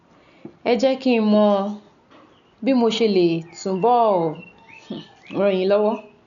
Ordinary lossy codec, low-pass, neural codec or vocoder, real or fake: none; 7.2 kHz; none; real